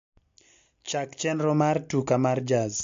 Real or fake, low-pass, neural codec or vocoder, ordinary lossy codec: real; 7.2 kHz; none; MP3, 48 kbps